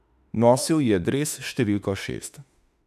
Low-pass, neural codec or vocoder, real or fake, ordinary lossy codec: 14.4 kHz; autoencoder, 48 kHz, 32 numbers a frame, DAC-VAE, trained on Japanese speech; fake; none